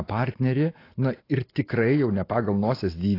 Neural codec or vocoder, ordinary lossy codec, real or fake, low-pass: none; AAC, 24 kbps; real; 5.4 kHz